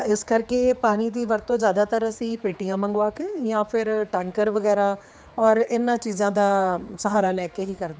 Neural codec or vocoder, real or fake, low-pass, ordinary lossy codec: codec, 16 kHz, 4 kbps, X-Codec, HuBERT features, trained on general audio; fake; none; none